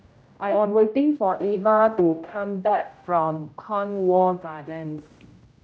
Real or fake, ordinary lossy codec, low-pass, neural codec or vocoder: fake; none; none; codec, 16 kHz, 0.5 kbps, X-Codec, HuBERT features, trained on general audio